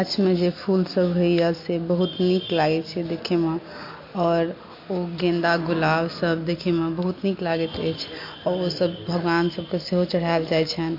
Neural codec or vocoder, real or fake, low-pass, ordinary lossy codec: none; real; 5.4 kHz; MP3, 32 kbps